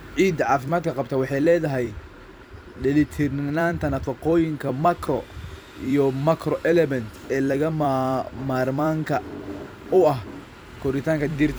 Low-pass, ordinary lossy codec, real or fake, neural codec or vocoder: none; none; fake; vocoder, 44.1 kHz, 128 mel bands every 256 samples, BigVGAN v2